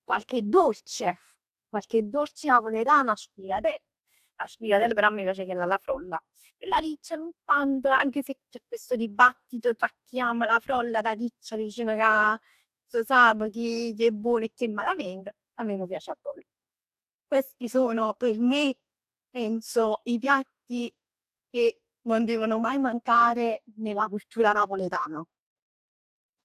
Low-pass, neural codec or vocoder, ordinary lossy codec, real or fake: 14.4 kHz; codec, 44.1 kHz, 2.6 kbps, DAC; AAC, 96 kbps; fake